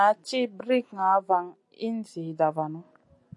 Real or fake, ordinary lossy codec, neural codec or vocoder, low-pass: real; AAC, 64 kbps; none; 10.8 kHz